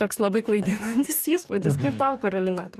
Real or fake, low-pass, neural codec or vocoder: fake; 14.4 kHz; codec, 44.1 kHz, 2.6 kbps, DAC